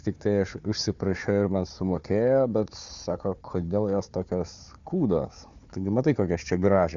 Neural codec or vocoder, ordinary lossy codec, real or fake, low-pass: codec, 16 kHz, 4 kbps, FunCodec, trained on Chinese and English, 50 frames a second; Opus, 64 kbps; fake; 7.2 kHz